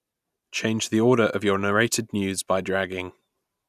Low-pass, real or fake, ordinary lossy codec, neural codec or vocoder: 14.4 kHz; real; none; none